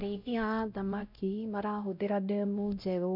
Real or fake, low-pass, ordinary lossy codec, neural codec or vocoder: fake; 5.4 kHz; none; codec, 16 kHz, 0.5 kbps, X-Codec, WavLM features, trained on Multilingual LibriSpeech